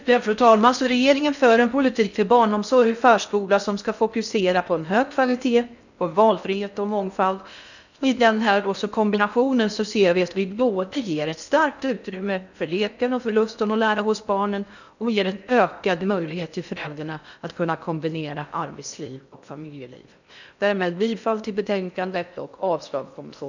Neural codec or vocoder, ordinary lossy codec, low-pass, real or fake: codec, 16 kHz in and 24 kHz out, 0.6 kbps, FocalCodec, streaming, 4096 codes; none; 7.2 kHz; fake